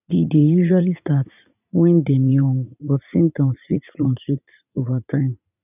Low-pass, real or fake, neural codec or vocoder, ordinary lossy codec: 3.6 kHz; fake; vocoder, 44.1 kHz, 80 mel bands, Vocos; none